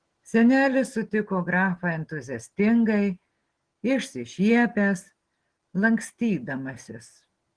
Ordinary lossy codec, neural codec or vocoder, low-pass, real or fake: Opus, 16 kbps; none; 9.9 kHz; real